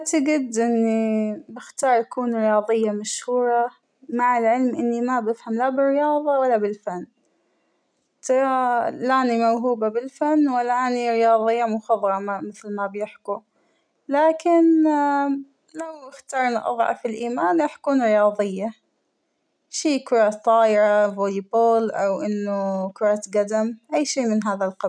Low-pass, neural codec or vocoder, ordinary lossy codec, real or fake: 9.9 kHz; none; none; real